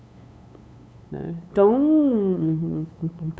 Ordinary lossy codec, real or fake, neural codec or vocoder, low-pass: none; fake; codec, 16 kHz, 8 kbps, FunCodec, trained on LibriTTS, 25 frames a second; none